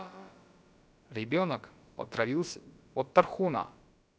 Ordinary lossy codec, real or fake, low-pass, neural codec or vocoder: none; fake; none; codec, 16 kHz, about 1 kbps, DyCAST, with the encoder's durations